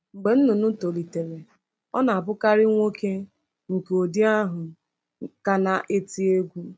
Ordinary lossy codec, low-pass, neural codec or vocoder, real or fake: none; none; none; real